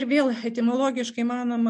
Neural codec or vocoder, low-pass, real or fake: none; 10.8 kHz; real